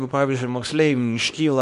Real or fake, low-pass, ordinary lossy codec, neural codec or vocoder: fake; 10.8 kHz; MP3, 64 kbps; codec, 24 kHz, 0.9 kbps, WavTokenizer, small release